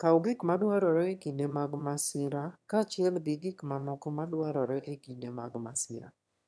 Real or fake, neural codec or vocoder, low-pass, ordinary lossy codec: fake; autoencoder, 22.05 kHz, a latent of 192 numbers a frame, VITS, trained on one speaker; none; none